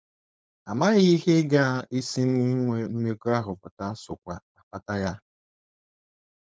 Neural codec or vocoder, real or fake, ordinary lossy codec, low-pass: codec, 16 kHz, 4.8 kbps, FACodec; fake; none; none